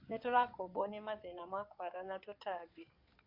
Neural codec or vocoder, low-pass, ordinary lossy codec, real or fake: codec, 16 kHz in and 24 kHz out, 2.2 kbps, FireRedTTS-2 codec; 5.4 kHz; AAC, 32 kbps; fake